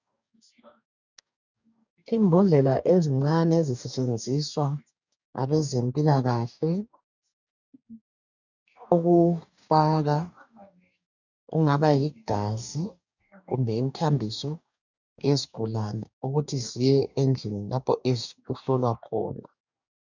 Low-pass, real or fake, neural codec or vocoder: 7.2 kHz; fake; codec, 44.1 kHz, 2.6 kbps, DAC